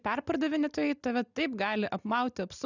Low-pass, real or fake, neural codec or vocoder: 7.2 kHz; real; none